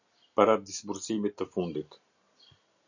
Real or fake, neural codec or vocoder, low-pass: real; none; 7.2 kHz